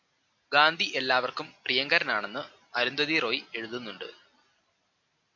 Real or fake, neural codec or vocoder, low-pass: real; none; 7.2 kHz